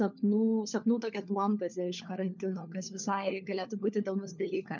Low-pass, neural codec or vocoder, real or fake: 7.2 kHz; codec, 16 kHz, 4 kbps, FunCodec, trained on LibriTTS, 50 frames a second; fake